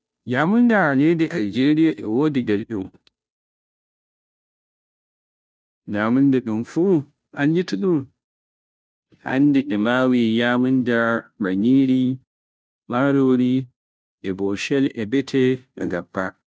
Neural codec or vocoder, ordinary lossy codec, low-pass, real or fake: codec, 16 kHz, 0.5 kbps, FunCodec, trained on Chinese and English, 25 frames a second; none; none; fake